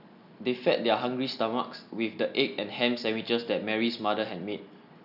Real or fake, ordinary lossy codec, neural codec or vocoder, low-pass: real; none; none; 5.4 kHz